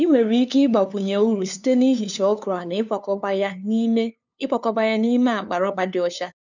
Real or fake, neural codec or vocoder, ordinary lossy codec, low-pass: fake; codec, 16 kHz, 2 kbps, FunCodec, trained on LibriTTS, 25 frames a second; none; 7.2 kHz